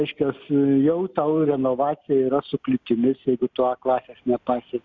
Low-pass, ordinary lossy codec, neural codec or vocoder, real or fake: 7.2 kHz; Opus, 64 kbps; none; real